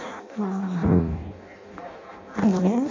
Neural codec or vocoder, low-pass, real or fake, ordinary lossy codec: codec, 16 kHz in and 24 kHz out, 0.6 kbps, FireRedTTS-2 codec; 7.2 kHz; fake; AAC, 32 kbps